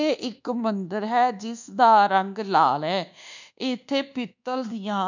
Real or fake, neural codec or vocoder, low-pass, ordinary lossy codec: fake; codec, 24 kHz, 1.2 kbps, DualCodec; 7.2 kHz; none